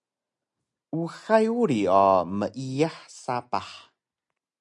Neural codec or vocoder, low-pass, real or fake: none; 10.8 kHz; real